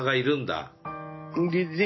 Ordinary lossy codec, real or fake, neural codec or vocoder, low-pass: MP3, 24 kbps; real; none; 7.2 kHz